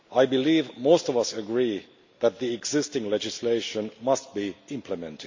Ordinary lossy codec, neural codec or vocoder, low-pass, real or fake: MP3, 48 kbps; none; 7.2 kHz; real